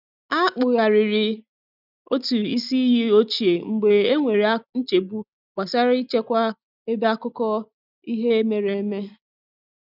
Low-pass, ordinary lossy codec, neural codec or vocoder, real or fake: 5.4 kHz; none; none; real